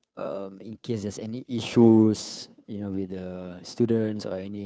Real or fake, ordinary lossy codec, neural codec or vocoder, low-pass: fake; none; codec, 16 kHz, 2 kbps, FunCodec, trained on Chinese and English, 25 frames a second; none